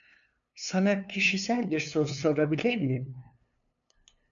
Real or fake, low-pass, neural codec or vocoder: fake; 7.2 kHz; codec, 16 kHz, 2 kbps, FunCodec, trained on LibriTTS, 25 frames a second